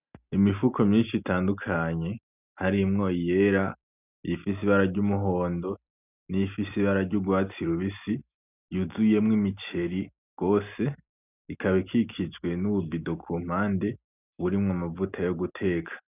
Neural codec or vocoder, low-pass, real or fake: none; 3.6 kHz; real